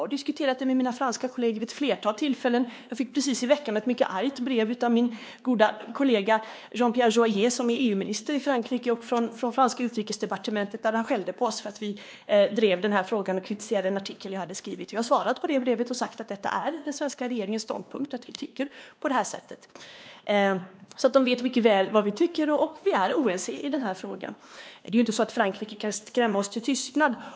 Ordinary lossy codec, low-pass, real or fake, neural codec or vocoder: none; none; fake; codec, 16 kHz, 2 kbps, X-Codec, WavLM features, trained on Multilingual LibriSpeech